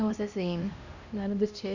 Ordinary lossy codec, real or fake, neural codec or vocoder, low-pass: none; fake; codec, 16 kHz, 1 kbps, X-Codec, HuBERT features, trained on LibriSpeech; 7.2 kHz